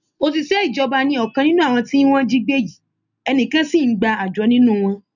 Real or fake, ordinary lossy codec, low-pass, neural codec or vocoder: real; none; 7.2 kHz; none